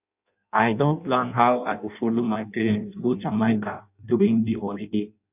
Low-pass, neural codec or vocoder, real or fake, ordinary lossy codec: 3.6 kHz; codec, 16 kHz in and 24 kHz out, 0.6 kbps, FireRedTTS-2 codec; fake; none